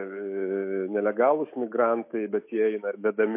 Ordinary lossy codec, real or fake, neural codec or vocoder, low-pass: MP3, 24 kbps; fake; autoencoder, 48 kHz, 128 numbers a frame, DAC-VAE, trained on Japanese speech; 3.6 kHz